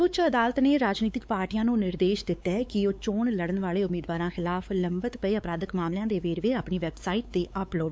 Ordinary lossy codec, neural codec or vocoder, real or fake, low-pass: none; codec, 16 kHz, 4 kbps, X-Codec, WavLM features, trained on Multilingual LibriSpeech; fake; none